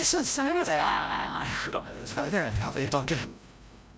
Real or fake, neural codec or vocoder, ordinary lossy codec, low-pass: fake; codec, 16 kHz, 0.5 kbps, FreqCodec, larger model; none; none